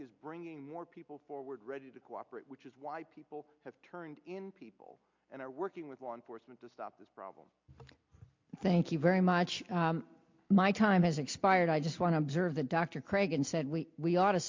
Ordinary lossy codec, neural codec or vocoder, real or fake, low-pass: MP3, 48 kbps; none; real; 7.2 kHz